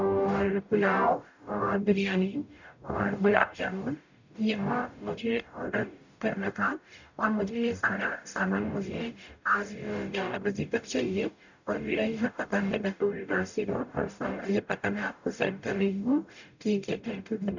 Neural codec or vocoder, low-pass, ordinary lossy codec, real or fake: codec, 44.1 kHz, 0.9 kbps, DAC; 7.2 kHz; none; fake